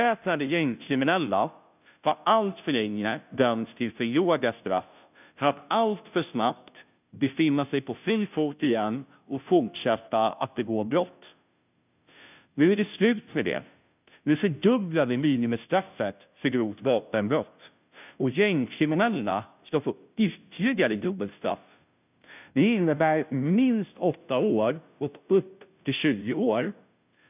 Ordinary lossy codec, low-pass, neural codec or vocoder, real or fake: none; 3.6 kHz; codec, 16 kHz, 0.5 kbps, FunCodec, trained on Chinese and English, 25 frames a second; fake